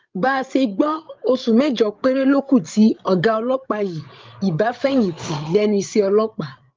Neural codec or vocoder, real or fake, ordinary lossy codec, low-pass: codec, 16 kHz, 8 kbps, FreqCodec, larger model; fake; Opus, 32 kbps; 7.2 kHz